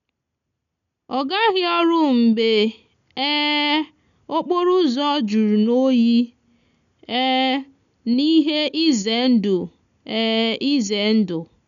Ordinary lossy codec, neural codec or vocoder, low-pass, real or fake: none; none; 7.2 kHz; real